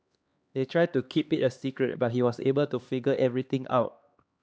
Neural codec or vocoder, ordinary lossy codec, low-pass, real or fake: codec, 16 kHz, 2 kbps, X-Codec, HuBERT features, trained on LibriSpeech; none; none; fake